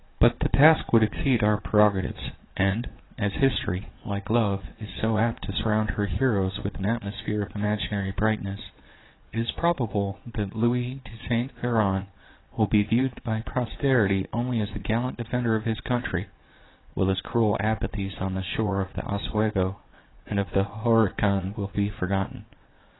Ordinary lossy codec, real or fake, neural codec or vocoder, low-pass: AAC, 16 kbps; fake; vocoder, 22.05 kHz, 80 mel bands, Vocos; 7.2 kHz